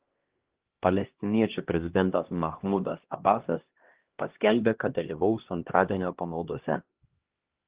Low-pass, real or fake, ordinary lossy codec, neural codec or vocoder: 3.6 kHz; fake; Opus, 16 kbps; codec, 16 kHz, 1 kbps, X-Codec, HuBERT features, trained on LibriSpeech